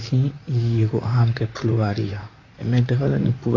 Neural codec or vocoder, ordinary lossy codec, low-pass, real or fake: vocoder, 44.1 kHz, 128 mel bands, Pupu-Vocoder; AAC, 32 kbps; 7.2 kHz; fake